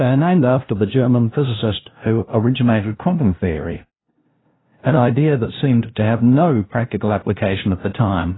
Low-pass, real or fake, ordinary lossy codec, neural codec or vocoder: 7.2 kHz; fake; AAC, 16 kbps; codec, 16 kHz, 0.5 kbps, FunCodec, trained on LibriTTS, 25 frames a second